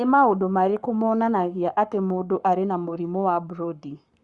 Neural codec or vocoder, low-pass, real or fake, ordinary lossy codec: codec, 44.1 kHz, 7.8 kbps, Pupu-Codec; 10.8 kHz; fake; Opus, 64 kbps